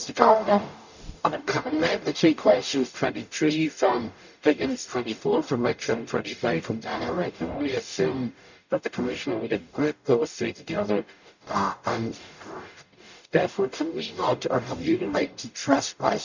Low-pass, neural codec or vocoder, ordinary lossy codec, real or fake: 7.2 kHz; codec, 44.1 kHz, 0.9 kbps, DAC; none; fake